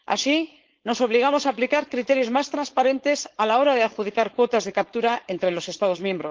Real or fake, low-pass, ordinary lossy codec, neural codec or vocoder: fake; 7.2 kHz; Opus, 16 kbps; codec, 16 kHz, 4.8 kbps, FACodec